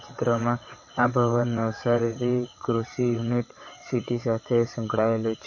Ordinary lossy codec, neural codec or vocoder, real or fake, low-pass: MP3, 32 kbps; vocoder, 22.05 kHz, 80 mel bands, WaveNeXt; fake; 7.2 kHz